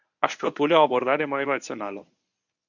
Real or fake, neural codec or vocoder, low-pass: fake; codec, 24 kHz, 0.9 kbps, WavTokenizer, medium speech release version 1; 7.2 kHz